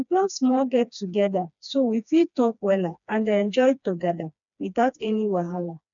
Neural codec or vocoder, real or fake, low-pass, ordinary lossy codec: codec, 16 kHz, 2 kbps, FreqCodec, smaller model; fake; 7.2 kHz; none